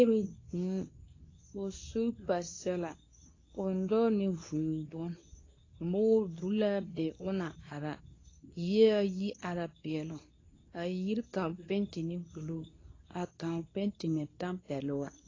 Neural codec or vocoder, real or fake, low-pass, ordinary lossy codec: codec, 24 kHz, 0.9 kbps, WavTokenizer, medium speech release version 2; fake; 7.2 kHz; AAC, 32 kbps